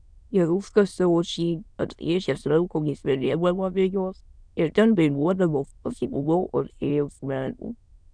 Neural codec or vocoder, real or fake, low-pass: autoencoder, 22.05 kHz, a latent of 192 numbers a frame, VITS, trained on many speakers; fake; 9.9 kHz